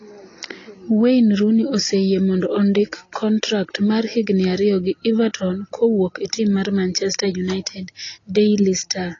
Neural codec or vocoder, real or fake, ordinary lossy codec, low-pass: none; real; AAC, 32 kbps; 7.2 kHz